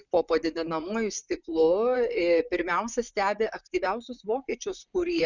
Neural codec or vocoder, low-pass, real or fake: vocoder, 44.1 kHz, 128 mel bands, Pupu-Vocoder; 7.2 kHz; fake